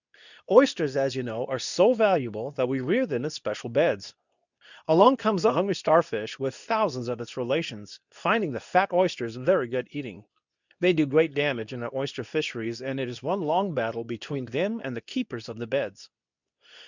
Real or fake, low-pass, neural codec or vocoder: fake; 7.2 kHz; codec, 24 kHz, 0.9 kbps, WavTokenizer, medium speech release version 2